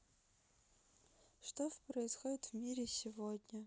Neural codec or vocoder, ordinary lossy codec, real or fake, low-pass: none; none; real; none